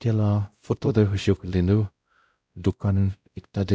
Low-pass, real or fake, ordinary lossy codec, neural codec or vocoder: none; fake; none; codec, 16 kHz, 0.5 kbps, X-Codec, WavLM features, trained on Multilingual LibriSpeech